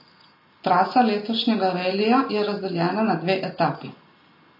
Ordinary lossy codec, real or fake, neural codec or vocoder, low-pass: MP3, 24 kbps; real; none; 5.4 kHz